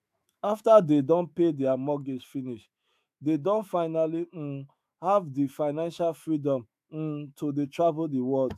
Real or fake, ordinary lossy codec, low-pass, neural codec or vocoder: fake; AAC, 96 kbps; 14.4 kHz; autoencoder, 48 kHz, 128 numbers a frame, DAC-VAE, trained on Japanese speech